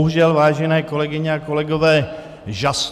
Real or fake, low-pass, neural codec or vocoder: real; 14.4 kHz; none